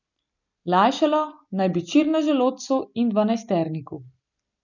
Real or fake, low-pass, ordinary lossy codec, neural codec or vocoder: real; 7.2 kHz; none; none